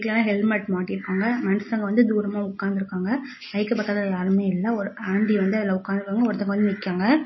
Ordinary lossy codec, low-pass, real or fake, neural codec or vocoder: MP3, 24 kbps; 7.2 kHz; real; none